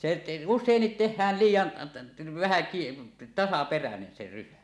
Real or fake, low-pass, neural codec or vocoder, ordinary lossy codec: real; none; none; none